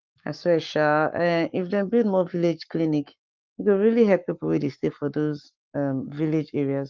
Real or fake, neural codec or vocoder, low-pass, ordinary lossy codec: fake; codec, 44.1 kHz, 7.8 kbps, Pupu-Codec; 7.2 kHz; Opus, 32 kbps